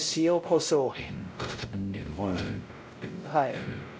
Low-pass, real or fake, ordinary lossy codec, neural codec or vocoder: none; fake; none; codec, 16 kHz, 0.5 kbps, X-Codec, WavLM features, trained on Multilingual LibriSpeech